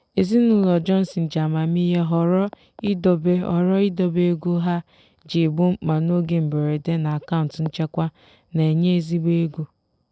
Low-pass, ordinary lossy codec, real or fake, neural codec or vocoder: none; none; real; none